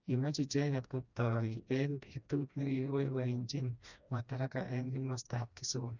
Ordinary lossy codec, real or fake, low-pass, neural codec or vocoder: none; fake; 7.2 kHz; codec, 16 kHz, 1 kbps, FreqCodec, smaller model